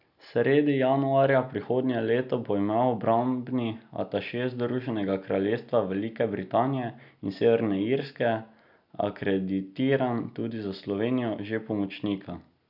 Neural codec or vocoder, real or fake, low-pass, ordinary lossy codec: none; real; 5.4 kHz; none